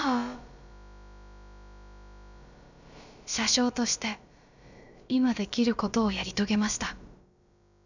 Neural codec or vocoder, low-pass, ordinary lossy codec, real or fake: codec, 16 kHz, about 1 kbps, DyCAST, with the encoder's durations; 7.2 kHz; none; fake